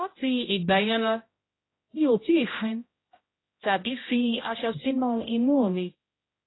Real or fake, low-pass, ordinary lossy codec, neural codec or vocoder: fake; 7.2 kHz; AAC, 16 kbps; codec, 16 kHz, 0.5 kbps, X-Codec, HuBERT features, trained on general audio